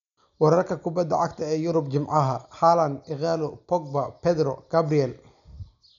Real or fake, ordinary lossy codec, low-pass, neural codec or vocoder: real; none; 7.2 kHz; none